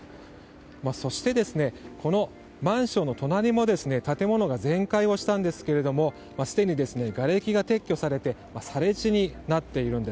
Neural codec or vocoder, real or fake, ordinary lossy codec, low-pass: none; real; none; none